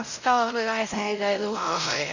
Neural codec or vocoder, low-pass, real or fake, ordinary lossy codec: codec, 16 kHz, 0.5 kbps, X-Codec, WavLM features, trained on Multilingual LibriSpeech; 7.2 kHz; fake; none